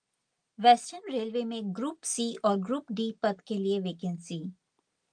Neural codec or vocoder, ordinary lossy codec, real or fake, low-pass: none; Opus, 32 kbps; real; 9.9 kHz